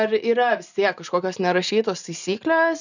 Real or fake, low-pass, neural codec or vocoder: real; 7.2 kHz; none